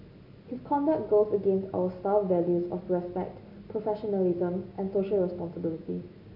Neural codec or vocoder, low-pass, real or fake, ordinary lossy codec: none; 5.4 kHz; real; none